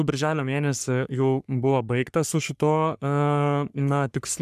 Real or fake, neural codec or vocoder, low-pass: fake; codec, 44.1 kHz, 3.4 kbps, Pupu-Codec; 14.4 kHz